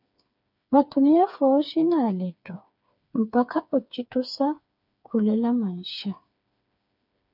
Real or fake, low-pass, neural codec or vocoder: fake; 5.4 kHz; codec, 16 kHz, 4 kbps, FreqCodec, smaller model